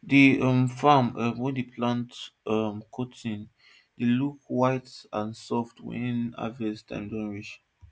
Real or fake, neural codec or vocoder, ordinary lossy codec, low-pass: real; none; none; none